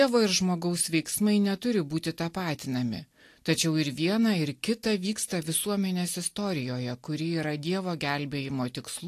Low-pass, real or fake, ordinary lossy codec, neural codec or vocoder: 14.4 kHz; real; AAC, 64 kbps; none